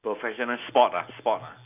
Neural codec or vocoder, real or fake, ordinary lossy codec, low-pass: none; real; none; 3.6 kHz